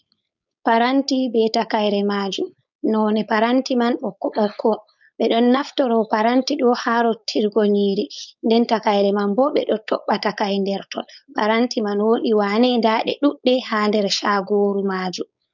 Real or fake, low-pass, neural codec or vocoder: fake; 7.2 kHz; codec, 16 kHz, 4.8 kbps, FACodec